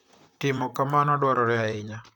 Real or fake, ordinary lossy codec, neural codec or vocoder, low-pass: fake; none; vocoder, 44.1 kHz, 128 mel bands, Pupu-Vocoder; 19.8 kHz